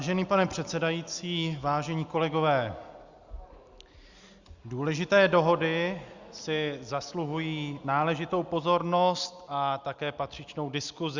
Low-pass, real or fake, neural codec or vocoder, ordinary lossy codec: 7.2 kHz; real; none; Opus, 64 kbps